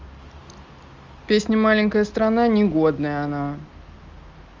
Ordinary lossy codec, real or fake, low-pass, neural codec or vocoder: Opus, 32 kbps; real; 7.2 kHz; none